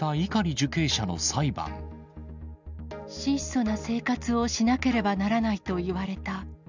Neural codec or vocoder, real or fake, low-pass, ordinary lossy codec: none; real; 7.2 kHz; none